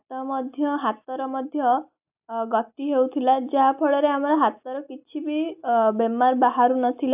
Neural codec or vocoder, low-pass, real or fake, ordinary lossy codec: none; 3.6 kHz; real; AAC, 32 kbps